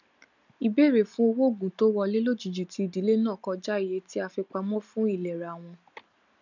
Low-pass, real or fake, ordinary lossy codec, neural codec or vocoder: 7.2 kHz; real; none; none